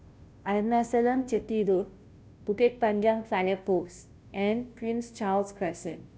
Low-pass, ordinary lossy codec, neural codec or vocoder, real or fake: none; none; codec, 16 kHz, 0.5 kbps, FunCodec, trained on Chinese and English, 25 frames a second; fake